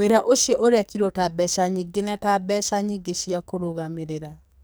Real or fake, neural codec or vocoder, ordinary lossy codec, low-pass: fake; codec, 44.1 kHz, 2.6 kbps, SNAC; none; none